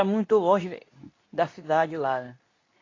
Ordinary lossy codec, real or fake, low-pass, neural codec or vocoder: AAC, 32 kbps; fake; 7.2 kHz; codec, 24 kHz, 0.9 kbps, WavTokenizer, medium speech release version 2